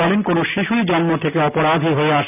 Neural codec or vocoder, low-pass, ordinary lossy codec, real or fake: none; 3.6 kHz; none; real